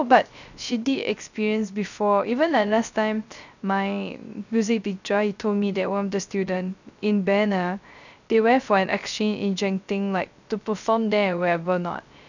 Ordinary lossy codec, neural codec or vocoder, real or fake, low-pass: none; codec, 16 kHz, 0.3 kbps, FocalCodec; fake; 7.2 kHz